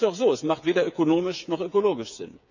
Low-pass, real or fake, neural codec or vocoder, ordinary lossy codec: 7.2 kHz; fake; codec, 16 kHz, 8 kbps, FreqCodec, smaller model; none